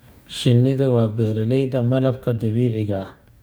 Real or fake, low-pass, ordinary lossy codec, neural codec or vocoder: fake; none; none; codec, 44.1 kHz, 2.6 kbps, DAC